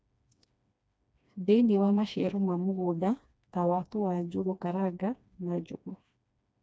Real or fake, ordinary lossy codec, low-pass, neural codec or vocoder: fake; none; none; codec, 16 kHz, 2 kbps, FreqCodec, smaller model